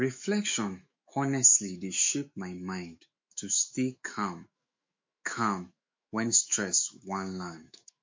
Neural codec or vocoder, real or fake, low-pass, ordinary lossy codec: autoencoder, 48 kHz, 128 numbers a frame, DAC-VAE, trained on Japanese speech; fake; 7.2 kHz; MP3, 48 kbps